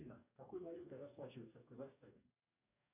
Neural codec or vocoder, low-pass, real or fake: codec, 44.1 kHz, 2.6 kbps, DAC; 3.6 kHz; fake